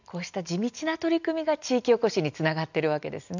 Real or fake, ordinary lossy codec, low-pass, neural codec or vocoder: real; none; 7.2 kHz; none